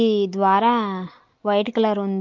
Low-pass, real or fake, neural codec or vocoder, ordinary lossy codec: 7.2 kHz; real; none; Opus, 24 kbps